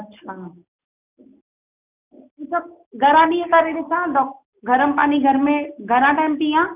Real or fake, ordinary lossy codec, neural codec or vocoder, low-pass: real; none; none; 3.6 kHz